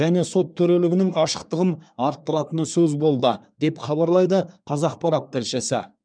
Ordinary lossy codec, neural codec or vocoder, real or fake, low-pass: MP3, 96 kbps; codec, 24 kHz, 1 kbps, SNAC; fake; 9.9 kHz